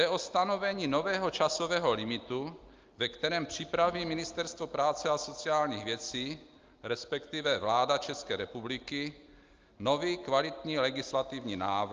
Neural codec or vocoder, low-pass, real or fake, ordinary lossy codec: none; 7.2 kHz; real; Opus, 24 kbps